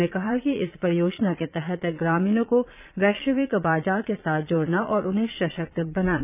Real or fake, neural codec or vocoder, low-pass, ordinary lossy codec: fake; vocoder, 22.05 kHz, 80 mel bands, Vocos; 3.6 kHz; none